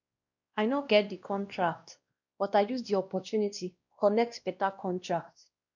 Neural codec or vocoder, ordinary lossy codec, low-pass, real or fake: codec, 16 kHz, 1 kbps, X-Codec, WavLM features, trained on Multilingual LibriSpeech; none; 7.2 kHz; fake